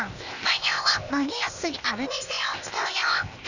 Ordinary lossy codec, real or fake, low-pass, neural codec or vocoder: none; fake; 7.2 kHz; codec, 16 kHz, 0.8 kbps, ZipCodec